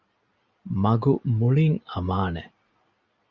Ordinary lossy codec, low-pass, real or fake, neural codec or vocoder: Opus, 64 kbps; 7.2 kHz; real; none